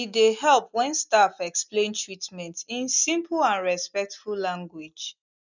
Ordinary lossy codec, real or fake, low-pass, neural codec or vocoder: none; real; 7.2 kHz; none